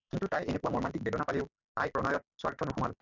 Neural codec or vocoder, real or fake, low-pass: none; real; 7.2 kHz